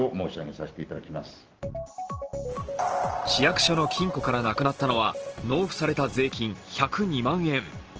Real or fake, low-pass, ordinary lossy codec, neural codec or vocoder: real; 7.2 kHz; Opus, 16 kbps; none